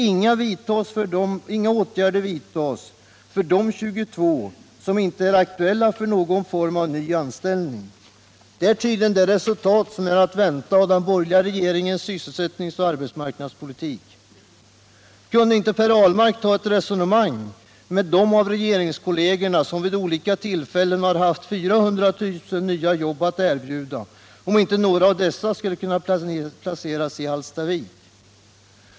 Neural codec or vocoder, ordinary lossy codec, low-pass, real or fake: none; none; none; real